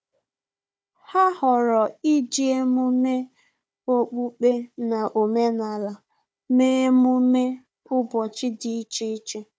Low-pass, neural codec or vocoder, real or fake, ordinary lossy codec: none; codec, 16 kHz, 4 kbps, FunCodec, trained on Chinese and English, 50 frames a second; fake; none